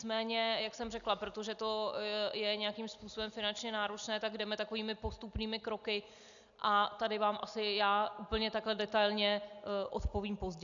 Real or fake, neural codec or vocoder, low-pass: real; none; 7.2 kHz